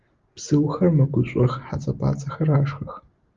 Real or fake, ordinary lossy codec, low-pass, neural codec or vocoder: real; Opus, 32 kbps; 7.2 kHz; none